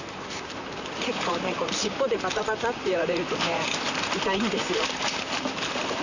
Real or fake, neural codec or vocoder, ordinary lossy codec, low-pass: fake; vocoder, 44.1 kHz, 128 mel bands, Pupu-Vocoder; none; 7.2 kHz